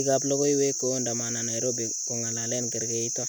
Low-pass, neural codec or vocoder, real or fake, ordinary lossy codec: none; none; real; none